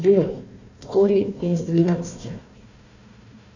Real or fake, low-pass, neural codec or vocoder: fake; 7.2 kHz; codec, 16 kHz, 1 kbps, FunCodec, trained on Chinese and English, 50 frames a second